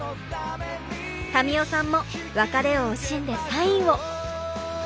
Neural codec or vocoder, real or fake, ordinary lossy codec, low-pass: none; real; none; none